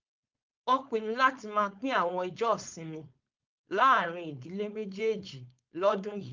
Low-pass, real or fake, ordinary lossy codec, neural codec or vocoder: 7.2 kHz; fake; Opus, 24 kbps; codec, 16 kHz, 4.8 kbps, FACodec